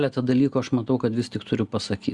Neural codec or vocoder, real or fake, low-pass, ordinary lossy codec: none; real; 10.8 kHz; Opus, 64 kbps